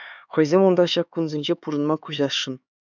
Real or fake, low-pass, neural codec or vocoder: fake; 7.2 kHz; codec, 16 kHz, 4 kbps, X-Codec, HuBERT features, trained on LibriSpeech